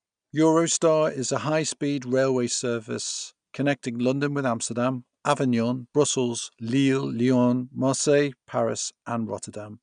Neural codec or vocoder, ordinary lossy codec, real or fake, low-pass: none; none; real; 9.9 kHz